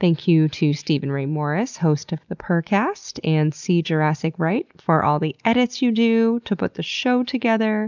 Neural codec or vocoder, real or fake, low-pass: none; real; 7.2 kHz